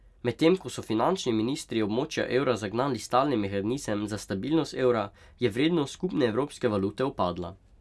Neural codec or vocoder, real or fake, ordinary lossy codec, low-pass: none; real; none; none